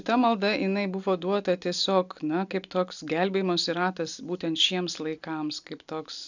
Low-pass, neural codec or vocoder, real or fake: 7.2 kHz; none; real